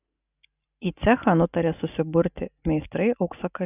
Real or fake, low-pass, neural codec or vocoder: real; 3.6 kHz; none